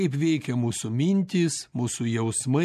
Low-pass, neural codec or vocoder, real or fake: 14.4 kHz; none; real